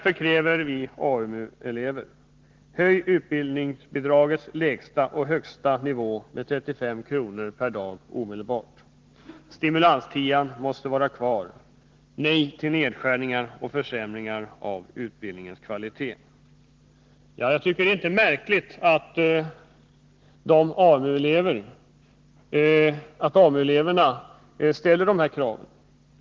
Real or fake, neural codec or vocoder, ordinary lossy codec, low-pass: real; none; Opus, 16 kbps; 7.2 kHz